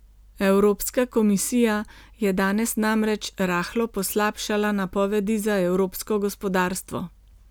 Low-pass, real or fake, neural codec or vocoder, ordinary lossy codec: none; real; none; none